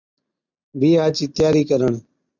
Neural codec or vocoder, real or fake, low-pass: none; real; 7.2 kHz